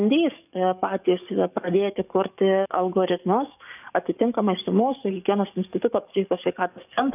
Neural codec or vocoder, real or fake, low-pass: codec, 44.1 kHz, 7.8 kbps, DAC; fake; 3.6 kHz